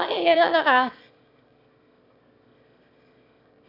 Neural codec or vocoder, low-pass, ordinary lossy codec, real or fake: autoencoder, 22.05 kHz, a latent of 192 numbers a frame, VITS, trained on one speaker; 5.4 kHz; none; fake